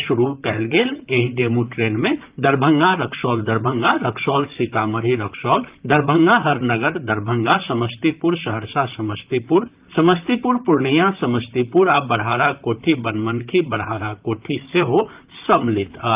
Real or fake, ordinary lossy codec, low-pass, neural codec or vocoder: fake; Opus, 24 kbps; 3.6 kHz; vocoder, 44.1 kHz, 128 mel bands, Pupu-Vocoder